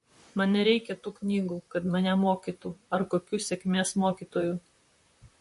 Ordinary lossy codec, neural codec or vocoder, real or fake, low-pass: MP3, 48 kbps; vocoder, 44.1 kHz, 128 mel bands, Pupu-Vocoder; fake; 14.4 kHz